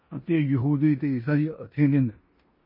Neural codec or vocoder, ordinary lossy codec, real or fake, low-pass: codec, 16 kHz in and 24 kHz out, 0.9 kbps, LongCat-Audio-Codec, four codebook decoder; MP3, 24 kbps; fake; 5.4 kHz